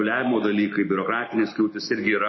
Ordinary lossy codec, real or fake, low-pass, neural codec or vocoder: MP3, 24 kbps; real; 7.2 kHz; none